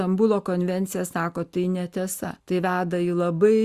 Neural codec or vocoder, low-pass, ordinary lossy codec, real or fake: none; 14.4 kHz; Opus, 64 kbps; real